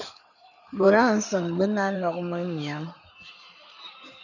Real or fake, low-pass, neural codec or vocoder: fake; 7.2 kHz; codec, 16 kHz in and 24 kHz out, 2.2 kbps, FireRedTTS-2 codec